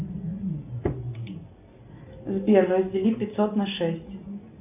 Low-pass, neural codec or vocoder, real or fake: 3.6 kHz; none; real